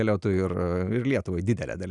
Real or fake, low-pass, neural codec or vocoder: real; 10.8 kHz; none